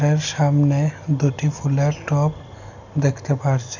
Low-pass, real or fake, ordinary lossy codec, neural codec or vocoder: 7.2 kHz; real; none; none